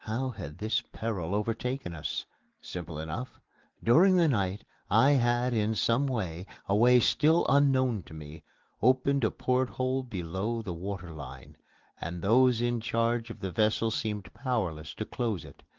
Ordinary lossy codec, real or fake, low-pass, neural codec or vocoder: Opus, 16 kbps; real; 7.2 kHz; none